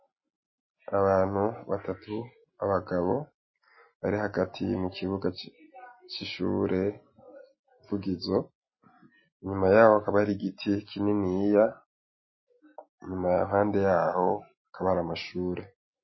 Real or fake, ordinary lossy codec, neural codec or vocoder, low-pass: real; MP3, 24 kbps; none; 7.2 kHz